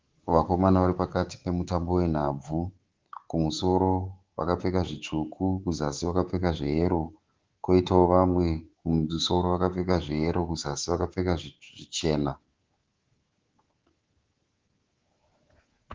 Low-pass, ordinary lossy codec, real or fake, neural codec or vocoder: 7.2 kHz; Opus, 16 kbps; fake; codec, 24 kHz, 3.1 kbps, DualCodec